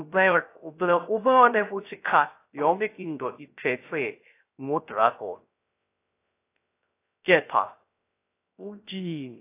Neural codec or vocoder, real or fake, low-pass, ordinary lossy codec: codec, 16 kHz, 0.3 kbps, FocalCodec; fake; 3.6 kHz; AAC, 24 kbps